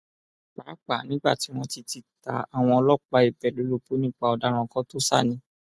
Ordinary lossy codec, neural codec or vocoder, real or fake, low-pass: none; none; real; none